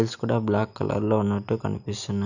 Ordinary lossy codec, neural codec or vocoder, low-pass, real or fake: none; none; 7.2 kHz; real